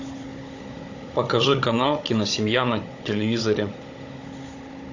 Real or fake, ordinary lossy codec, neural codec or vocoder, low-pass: fake; AAC, 48 kbps; codec, 16 kHz, 16 kbps, FunCodec, trained on Chinese and English, 50 frames a second; 7.2 kHz